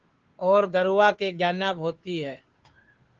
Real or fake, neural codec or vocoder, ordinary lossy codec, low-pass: fake; codec, 16 kHz, 2 kbps, FunCodec, trained on Chinese and English, 25 frames a second; Opus, 16 kbps; 7.2 kHz